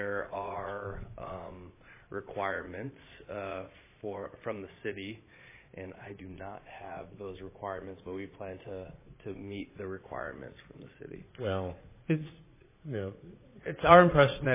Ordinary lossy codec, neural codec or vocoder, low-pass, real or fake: MP3, 16 kbps; vocoder, 22.05 kHz, 80 mel bands, Vocos; 3.6 kHz; fake